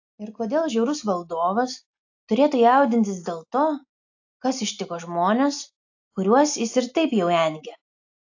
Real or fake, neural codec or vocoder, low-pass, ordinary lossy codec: real; none; 7.2 kHz; AAC, 48 kbps